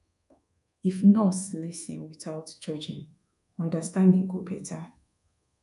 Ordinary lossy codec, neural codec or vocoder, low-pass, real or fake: none; codec, 24 kHz, 1.2 kbps, DualCodec; 10.8 kHz; fake